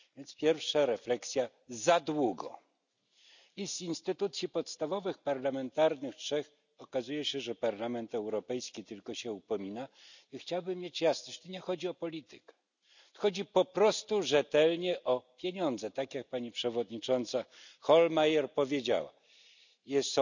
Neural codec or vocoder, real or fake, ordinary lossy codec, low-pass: none; real; none; 7.2 kHz